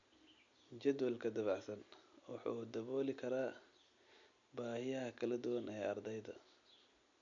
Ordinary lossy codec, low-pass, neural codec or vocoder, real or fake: none; 7.2 kHz; none; real